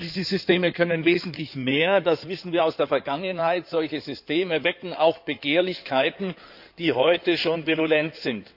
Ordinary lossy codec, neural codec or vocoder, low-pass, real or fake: none; codec, 16 kHz in and 24 kHz out, 2.2 kbps, FireRedTTS-2 codec; 5.4 kHz; fake